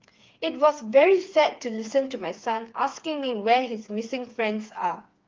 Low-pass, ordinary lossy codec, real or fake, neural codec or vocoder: 7.2 kHz; Opus, 24 kbps; fake; codec, 16 kHz, 4 kbps, FreqCodec, smaller model